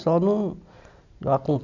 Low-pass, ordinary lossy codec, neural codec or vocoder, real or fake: 7.2 kHz; none; none; real